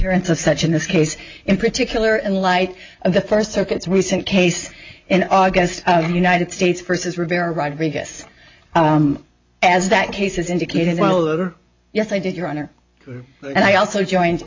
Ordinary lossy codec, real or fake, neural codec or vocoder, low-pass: MP3, 64 kbps; real; none; 7.2 kHz